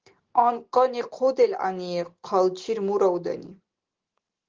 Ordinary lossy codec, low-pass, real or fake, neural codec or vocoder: Opus, 16 kbps; 7.2 kHz; real; none